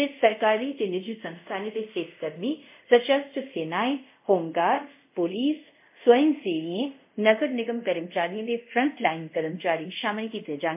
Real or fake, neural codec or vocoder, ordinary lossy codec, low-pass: fake; codec, 24 kHz, 0.5 kbps, DualCodec; MP3, 32 kbps; 3.6 kHz